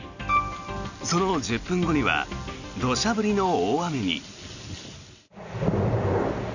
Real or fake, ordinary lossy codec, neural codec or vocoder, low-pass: real; none; none; 7.2 kHz